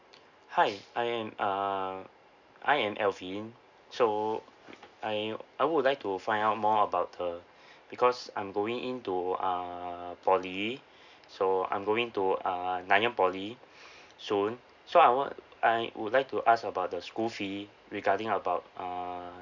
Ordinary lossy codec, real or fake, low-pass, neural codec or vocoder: none; real; 7.2 kHz; none